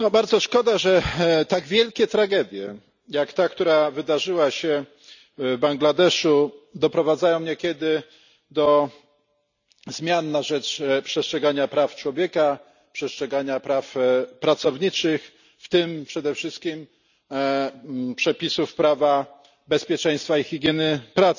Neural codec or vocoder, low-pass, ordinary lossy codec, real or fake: none; 7.2 kHz; none; real